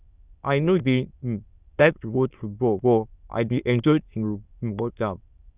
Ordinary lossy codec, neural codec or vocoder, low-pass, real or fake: Opus, 64 kbps; autoencoder, 22.05 kHz, a latent of 192 numbers a frame, VITS, trained on many speakers; 3.6 kHz; fake